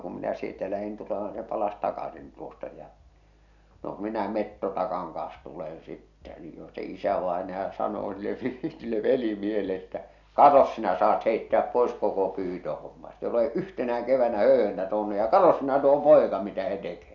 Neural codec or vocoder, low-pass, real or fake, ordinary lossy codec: none; 7.2 kHz; real; none